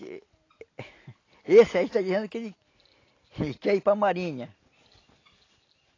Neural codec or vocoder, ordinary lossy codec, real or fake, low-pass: none; AAC, 32 kbps; real; 7.2 kHz